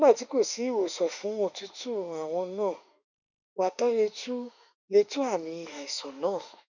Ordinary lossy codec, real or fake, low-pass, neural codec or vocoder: none; fake; 7.2 kHz; autoencoder, 48 kHz, 32 numbers a frame, DAC-VAE, trained on Japanese speech